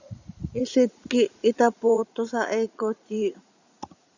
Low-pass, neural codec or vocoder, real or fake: 7.2 kHz; vocoder, 44.1 kHz, 80 mel bands, Vocos; fake